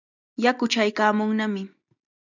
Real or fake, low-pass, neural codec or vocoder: real; 7.2 kHz; none